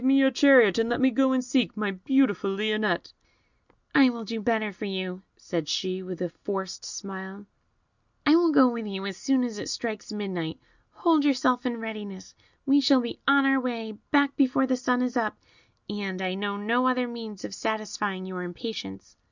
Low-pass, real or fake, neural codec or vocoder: 7.2 kHz; real; none